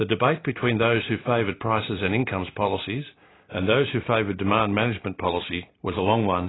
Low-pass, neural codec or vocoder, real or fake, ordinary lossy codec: 7.2 kHz; none; real; AAC, 16 kbps